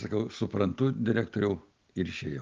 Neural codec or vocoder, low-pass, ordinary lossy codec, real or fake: none; 7.2 kHz; Opus, 24 kbps; real